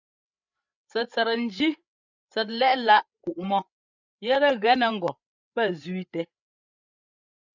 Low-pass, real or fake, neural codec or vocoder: 7.2 kHz; fake; codec, 16 kHz, 8 kbps, FreqCodec, larger model